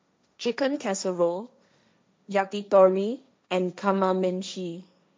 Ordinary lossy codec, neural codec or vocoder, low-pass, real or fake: none; codec, 16 kHz, 1.1 kbps, Voila-Tokenizer; none; fake